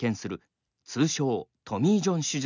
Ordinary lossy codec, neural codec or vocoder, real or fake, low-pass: none; none; real; 7.2 kHz